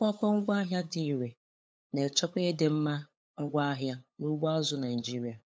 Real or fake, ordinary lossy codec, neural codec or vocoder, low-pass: fake; none; codec, 16 kHz, 8 kbps, FunCodec, trained on LibriTTS, 25 frames a second; none